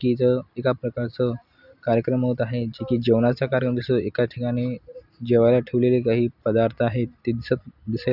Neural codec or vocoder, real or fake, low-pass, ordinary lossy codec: none; real; 5.4 kHz; none